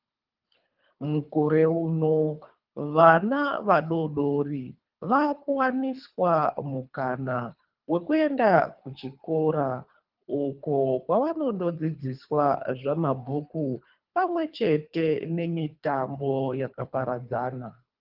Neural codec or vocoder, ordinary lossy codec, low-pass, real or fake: codec, 24 kHz, 3 kbps, HILCodec; Opus, 24 kbps; 5.4 kHz; fake